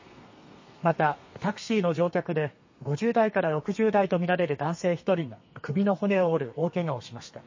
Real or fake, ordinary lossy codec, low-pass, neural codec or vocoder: fake; MP3, 32 kbps; 7.2 kHz; codec, 32 kHz, 1.9 kbps, SNAC